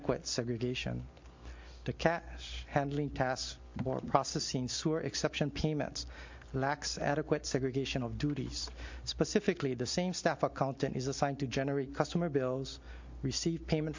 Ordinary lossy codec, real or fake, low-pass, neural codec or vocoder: MP3, 48 kbps; real; 7.2 kHz; none